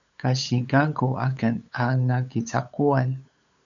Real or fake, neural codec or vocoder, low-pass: fake; codec, 16 kHz, 8 kbps, FunCodec, trained on LibriTTS, 25 frames a second; 7.2 kHz